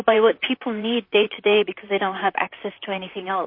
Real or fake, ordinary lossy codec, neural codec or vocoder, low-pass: fake; MP3, 32 kbps; vocoder, 44.1 kHz, 128 mel bands, Pupu-Vocoder; 5.4 kHz